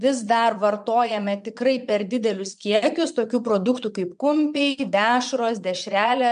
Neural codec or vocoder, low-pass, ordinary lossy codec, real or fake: vocoder, 22.05 kHz, 80 mel bands, Vocos; 9.9 kHz; MP3, 64 kbps; fake